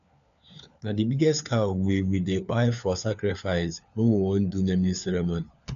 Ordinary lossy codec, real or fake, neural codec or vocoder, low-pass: none; fake; codec, 16 kHz, 4 kbps, FunCodec, trained on LibriTTS, 50 frames a second; 7.2 kHz